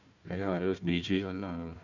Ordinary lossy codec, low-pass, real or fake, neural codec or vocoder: none; 7.2 kHz; fake; codec, 16 kHz, 1 kbps, FunCodec, trained on Chinese and English, 50 frames a second